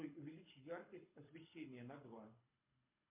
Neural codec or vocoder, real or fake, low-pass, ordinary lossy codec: codec, 24 kHz, 6 kbps, HILCodec; fake; 3.6 kHz; MP3, 32 kbps